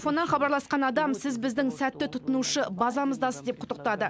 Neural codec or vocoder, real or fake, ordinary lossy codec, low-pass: none; real; none; none